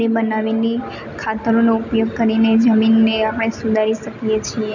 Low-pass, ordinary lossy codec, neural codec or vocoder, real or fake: 7.2 kHz; none; none; real